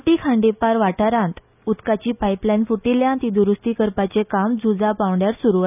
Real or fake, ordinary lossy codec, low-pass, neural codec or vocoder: real; none; 3.6 kHz; none